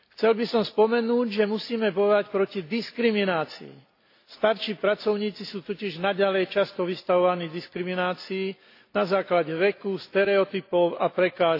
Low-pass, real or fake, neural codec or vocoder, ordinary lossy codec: 5.4 kHz; real; none; AAC, 32 kbps